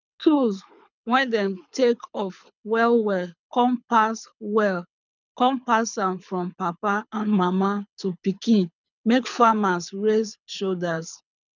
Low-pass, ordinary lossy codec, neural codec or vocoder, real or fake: 7.2 kHz; none; codec, 24 kHz, 6 kbps, HILCodec; fake